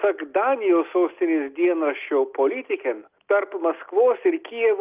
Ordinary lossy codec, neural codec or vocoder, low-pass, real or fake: Opus, 24 kbps; none; 3.6 kHz; real